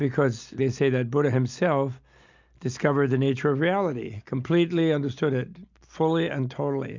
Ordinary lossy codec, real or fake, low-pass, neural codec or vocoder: MP3, 64 kbps; real; 7.2 kHz; none